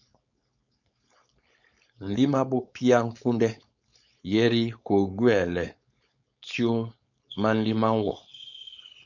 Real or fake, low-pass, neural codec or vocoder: fake; 7.2 kHz; codec, 16 kHz, 4.8 kbps, FACodec